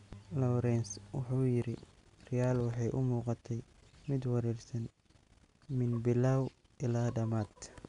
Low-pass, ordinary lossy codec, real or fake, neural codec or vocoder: 10.8 kHz; none; real; none